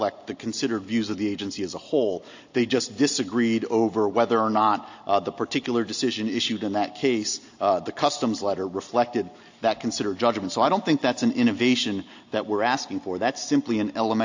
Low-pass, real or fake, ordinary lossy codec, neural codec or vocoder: 7.2 kHz; real; AAC, 48 kbps; none